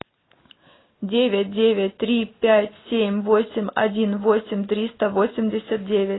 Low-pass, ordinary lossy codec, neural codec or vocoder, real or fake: 7.2 kHz; AAC, 16 kbps; none; real